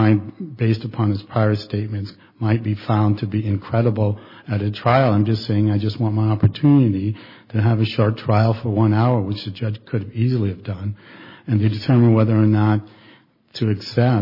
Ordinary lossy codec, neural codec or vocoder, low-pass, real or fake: MP3, 24 kbps; none; 5.4 kHz; real